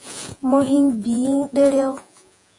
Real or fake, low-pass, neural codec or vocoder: fake; 10.8 kHz; vocoder, 48 kHz, 128 mel bands, Vocos